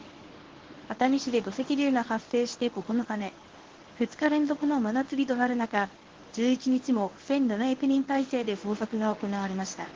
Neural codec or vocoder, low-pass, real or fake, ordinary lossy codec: codec, 24 kHz, 0.9 kbps, WavTokenizer, medium speech release version 1; 7.2 kHz; fake; Opus, 16 kbps